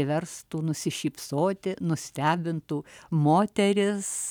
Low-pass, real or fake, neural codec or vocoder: 19.8 kHz; real; none